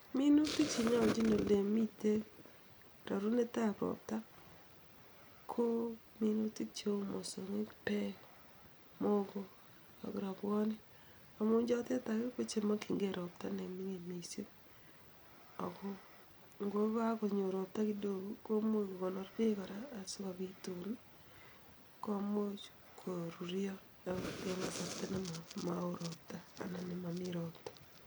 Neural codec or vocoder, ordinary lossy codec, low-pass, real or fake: none; none; none; real